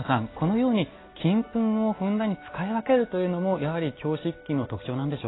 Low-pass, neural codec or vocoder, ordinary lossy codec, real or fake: 7.2 kHz; none; AAC, 16 kbps; real